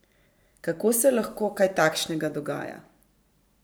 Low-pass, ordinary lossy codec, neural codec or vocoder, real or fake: none; none; vocoder, 44.1 kHz, 128 mel bands every 512 samples, BigVGAN v2; fake